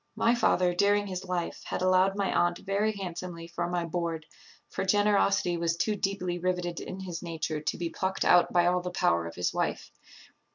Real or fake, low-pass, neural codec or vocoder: real; 7.2 kHz; none